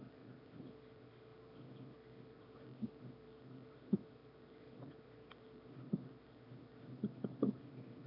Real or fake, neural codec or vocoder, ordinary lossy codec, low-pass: fake; autoencoder, 22.05 kHz, a latent of 192 numbers a frame, VITS, trained on one speaker; AAC, 32 kbps; 5.4 kHz